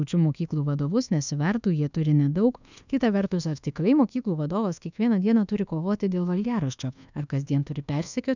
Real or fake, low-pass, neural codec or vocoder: fake; 7.2 kHz; codec, 24 kHz, 1.2 kbps, DualCodec